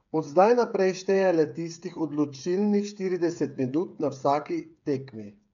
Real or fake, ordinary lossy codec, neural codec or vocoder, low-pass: fake; none; codec, 16 kHz, 8 kbps, FreqCodec, smaller model; 7.2 kHz